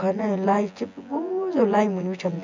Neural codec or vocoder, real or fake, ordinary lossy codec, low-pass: vocoder, 24 kHz, 100 mel bands, Vocos; fake; MP3, 64 kbps; 7.2 kHz